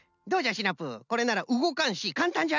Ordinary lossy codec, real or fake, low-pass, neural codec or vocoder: none; real; 7.2 kHz; none